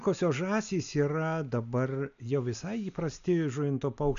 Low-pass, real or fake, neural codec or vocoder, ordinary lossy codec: 7.2 kHz; real; none; MP3, 96 kbps